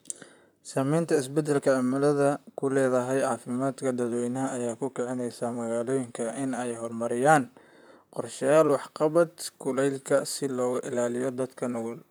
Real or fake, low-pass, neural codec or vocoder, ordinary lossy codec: fake; none; vocoder, 44.1 kHz, 128 mel bands, Pupu-Vocoder; none